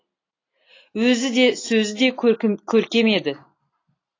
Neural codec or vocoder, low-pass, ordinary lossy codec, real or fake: none; 7.2 kHz; AAC, 32 kbps; real